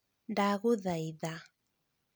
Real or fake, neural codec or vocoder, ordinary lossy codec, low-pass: real; none; none; none